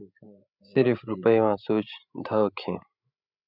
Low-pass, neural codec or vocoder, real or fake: 5.4 kHz; codec, 16 kHz, 16 kbps, FreqCodec, larger model; fake